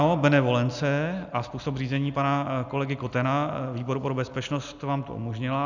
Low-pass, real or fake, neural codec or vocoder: 7.2 kHz; real; none